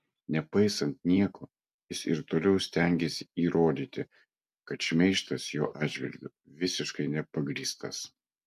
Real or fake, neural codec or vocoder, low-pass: real; none; 14.4 kHz